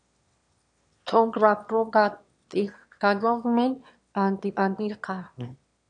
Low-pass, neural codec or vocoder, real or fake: 9.9 kHz; autoencoder, 22.05 kHz, a latent of 192 numbers a frame, VITS, trained on one speaker; fake